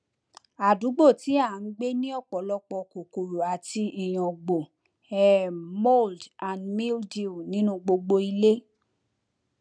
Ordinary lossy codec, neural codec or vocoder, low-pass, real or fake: none; none; 9.9 kHz; real